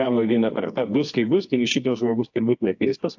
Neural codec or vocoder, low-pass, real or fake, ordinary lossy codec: codec, 24 kHz, 0.9 kbps, WavTokenizer, medium music audio release; 7.2 kHz; fake; AAC, 48 kbps